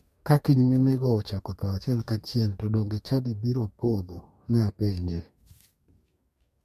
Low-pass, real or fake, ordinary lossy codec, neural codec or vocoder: 14.4 kHz; fake; MP3, 64 kbps; codec, 44.1 kHz, 2.6 kbps, DAC